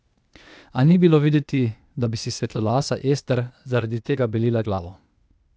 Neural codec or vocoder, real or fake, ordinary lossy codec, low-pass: codec, 16 kHz, 0.8 kbps, ZipCodec; fake; none; none